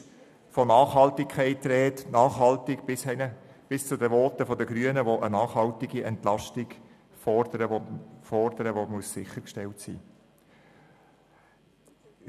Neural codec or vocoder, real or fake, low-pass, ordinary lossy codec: none; real; 14.4 kHz; none